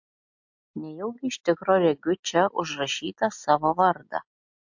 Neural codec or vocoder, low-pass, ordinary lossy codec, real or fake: none; 7.2 kHz; MP3, 48 kbps; real